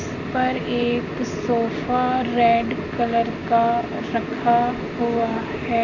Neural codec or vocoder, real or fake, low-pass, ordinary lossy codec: none; real; 7.2 kHz; none